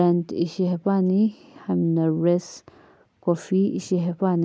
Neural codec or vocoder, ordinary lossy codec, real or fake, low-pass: none; none; real; none